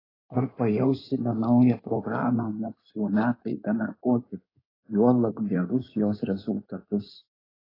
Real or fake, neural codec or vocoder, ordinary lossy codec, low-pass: fake; codec, 16 kHz in and 24 kHz out, 2.2 kbps, FireRedTTS-2 codec; AAC, 24 kbps; 5.4 kHz